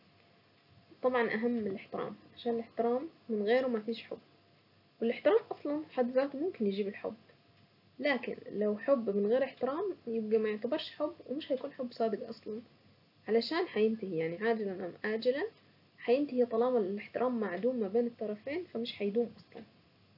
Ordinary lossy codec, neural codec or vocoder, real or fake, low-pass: none; none; real; 5.4 kHz